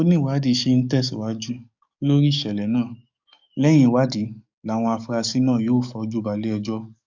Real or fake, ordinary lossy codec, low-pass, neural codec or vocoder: fake; MP3, 64 kbps; 7.2 kHz; codec, 44.1 kHz, 7.8 kbps, DAC